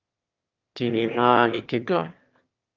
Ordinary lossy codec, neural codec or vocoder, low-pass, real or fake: Opus, 32 kbps; autoencoder, 22.05 kHz, a latent of 192 numbers a frame, VITS, trained on one speaker; 7.2 kHz; fake